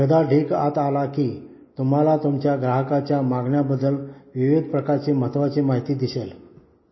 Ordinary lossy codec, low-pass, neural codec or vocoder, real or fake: MP3, 24 kbps; 7.2 kHz; none; real